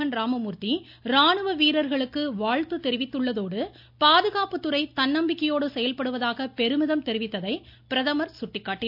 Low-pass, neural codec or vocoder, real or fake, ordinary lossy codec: 5.4 kHz; none; real; none